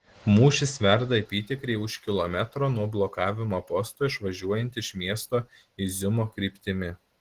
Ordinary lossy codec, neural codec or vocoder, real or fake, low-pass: Opus, 16 kbps; none; real; 14.4 kHz